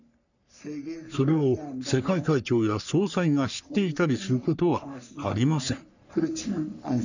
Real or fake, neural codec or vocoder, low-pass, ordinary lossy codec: fake; codec, 44.1 kHz, 3.4 kbps, Pupu-Codec; 7.2 kHz; none